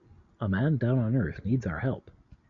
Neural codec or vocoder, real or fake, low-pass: none; real; 7.2 kHz